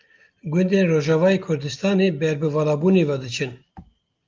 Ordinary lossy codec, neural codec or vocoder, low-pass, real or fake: Opus, 24 kbps; none; 7.2 kHz; real